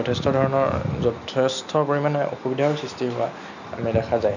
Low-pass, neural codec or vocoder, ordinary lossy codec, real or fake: 7.2 kHz; none; none; real